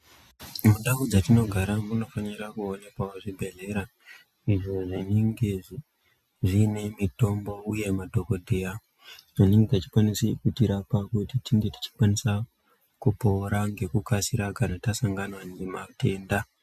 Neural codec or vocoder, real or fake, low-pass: none; real; 14.4 kHz